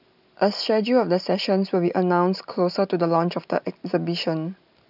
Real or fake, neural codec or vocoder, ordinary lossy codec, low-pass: real; none; none; 5.4 kHz